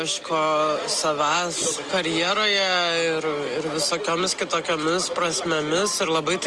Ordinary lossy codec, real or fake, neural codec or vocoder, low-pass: Opus, 24 kbps; real; none; 10.8 kHz